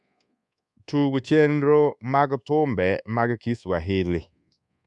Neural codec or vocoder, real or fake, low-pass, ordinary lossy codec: codec, 24 kHz, 1.2 kbps, DualCodec; fake; 10.8 kHz; none